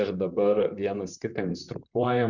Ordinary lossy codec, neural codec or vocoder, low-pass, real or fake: Opus, 64 kbps; vocoder, 44.1 kHz, 128 mel bands, Pupu-Vocoder; 7.2 kHz; fake